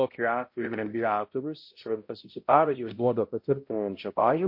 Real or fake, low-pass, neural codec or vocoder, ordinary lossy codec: fake; 5.4 kHz; codec, 16 kHz, 0.5 kbps, X-Codec, HuBERT features, trained on balanced general audio; MP3, 32 kbps